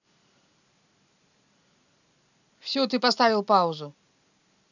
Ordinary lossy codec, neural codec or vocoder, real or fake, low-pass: none; none; real; 7.2 kHz